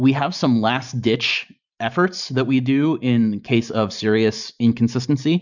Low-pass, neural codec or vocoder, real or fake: 7.2 kHz; vocoder, 44.1 kHz, 128 mel bands every 512 samples, BigVGAN v2; fake